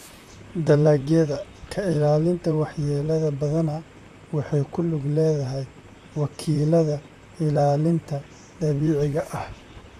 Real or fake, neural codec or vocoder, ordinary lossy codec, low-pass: fake; vocoder, 44.1 kHz, 128 mel bands, Pupu-Vocoder; MP3, 96 kbps; 14.4 kHz